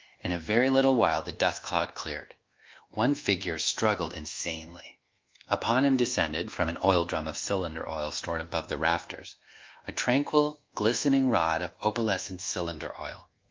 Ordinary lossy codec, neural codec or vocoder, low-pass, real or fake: Opus, 24 kbps; codec, 24 kHz, 1.2 kbps, DualCodec; 7.2 kHz; fake